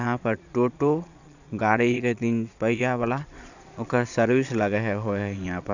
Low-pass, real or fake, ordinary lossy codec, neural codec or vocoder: 7.2 kHz; fake; none; vocoder, 22.05 kHz, 80 mel bands, Vocos